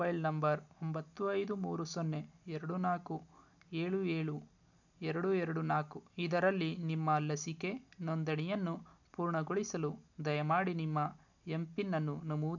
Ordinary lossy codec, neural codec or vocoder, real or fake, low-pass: none; none; real; 7.2 kHz